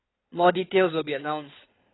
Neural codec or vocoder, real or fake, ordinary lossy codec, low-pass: codec, 16 kHz in and 24 kHz out, 2.2 kbps, FireRedTTS-2 codec; fake; AAC, 16 kbps; 7.2 kHz